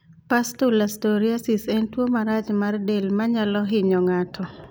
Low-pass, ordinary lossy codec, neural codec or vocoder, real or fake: none; none; none; real